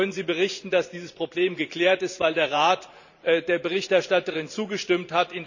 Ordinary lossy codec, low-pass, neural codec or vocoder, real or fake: none; 7.2 kHz; vocoder, 44.1 kHz, 128 mel bands every 512 samples, BigVGAN v2; fake